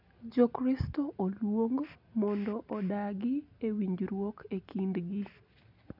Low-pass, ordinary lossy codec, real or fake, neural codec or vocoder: 5.4 kHz; none; real; none